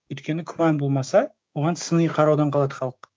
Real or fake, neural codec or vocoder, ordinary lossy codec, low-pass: fake; codec, 16 kHz, 6 kbps, DAC; none; none